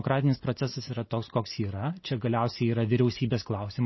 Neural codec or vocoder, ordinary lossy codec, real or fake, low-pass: none; MP3, 24 kbps; real; 7.2 kHz